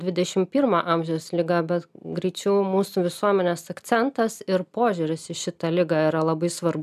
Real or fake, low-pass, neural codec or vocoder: real; 14.4 kHz; none